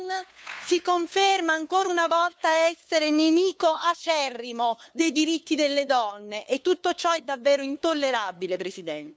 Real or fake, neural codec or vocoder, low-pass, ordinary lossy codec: fake; codec, 16 kHz, 2 kbps, FunCodec, trained on LibriTTS, 25 frames a second; none; none